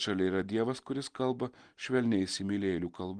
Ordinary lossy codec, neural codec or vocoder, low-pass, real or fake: Opus, 24 kbps; none; 9.9 kHz; real